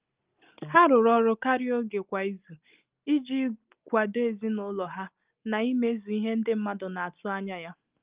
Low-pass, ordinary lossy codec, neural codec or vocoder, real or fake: 3.6 kHz; Opus, 24 kbps; none; real